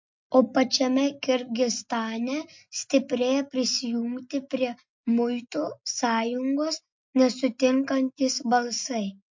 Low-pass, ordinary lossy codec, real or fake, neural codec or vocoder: 7.2 kHz; MP3, 48 kbps; real; none